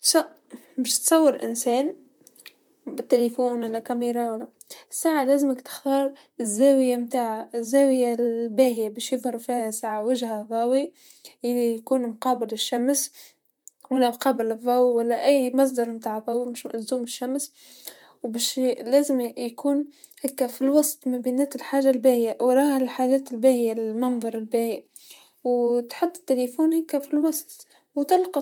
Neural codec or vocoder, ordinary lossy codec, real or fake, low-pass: vocoder, 44.1 kHz, 128 mel bands, Pupu-Vocoder; MP3, 96 kbps; fake; 19.8 kHz